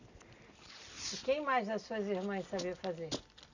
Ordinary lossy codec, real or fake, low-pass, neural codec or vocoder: none; real; 7.2 kHz; none